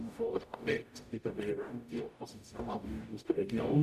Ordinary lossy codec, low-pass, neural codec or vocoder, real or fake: none; 14.4 kHz; codec, 44.1 kHz, 0.9 kbps, DAC; fake